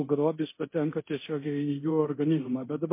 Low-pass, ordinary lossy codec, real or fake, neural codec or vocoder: 3.6 kHz; MP3, 24 kbps; fake; codec, 24 kHz, 0.9 kbps, DualCodec